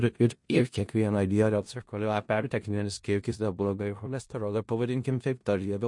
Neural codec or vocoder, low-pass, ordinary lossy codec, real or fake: codec, 16 kHz in and 24 kHz out, 0.4 kbps, LongCat-Audio-Codec, four codebook decoder; 10.8 kHz; MP3, 48 kbps; fake